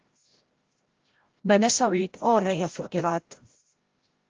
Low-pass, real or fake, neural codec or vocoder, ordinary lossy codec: 7.2 kHz; fake; codec, 16 kHz, 0.5 kbps, FreqCodec, larger model; Opus, 16 kbps